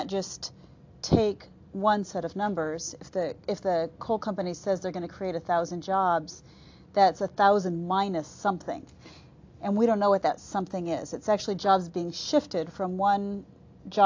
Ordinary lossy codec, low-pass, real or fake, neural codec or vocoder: AAC, 48 kbps; 7.2 kHz; real; none